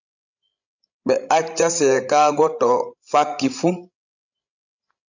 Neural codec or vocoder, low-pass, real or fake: codec, 16 kHz, 16 kbps, FreqCodec, larger model; 7.2 kHz; fake